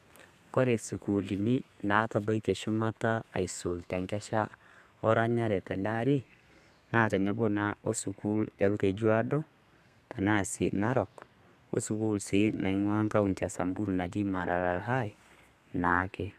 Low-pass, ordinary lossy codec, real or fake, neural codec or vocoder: 14.4 kHz; none; fake; codec, 32 kHz, 1.9 kbps, SNAC